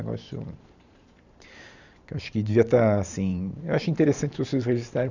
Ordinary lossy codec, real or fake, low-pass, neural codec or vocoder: none; fake; 7.2 kHz; vocoder, 44.1 kHz, 128 mel bands every 512 samples, BigVGAN v2